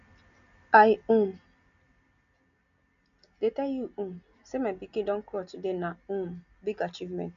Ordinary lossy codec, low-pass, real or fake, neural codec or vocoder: none; 7.2 kHz; real; none